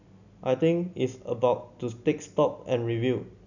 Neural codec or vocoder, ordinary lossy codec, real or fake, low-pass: none; none; real; 7.2 kHz